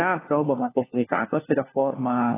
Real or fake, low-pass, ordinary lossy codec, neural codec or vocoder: fake; 3.6 kHz; AAC, 16 kbps; codec, 16 kHz, 1 kbps, FunCodec, trained on LibriTTS, 50 frames a second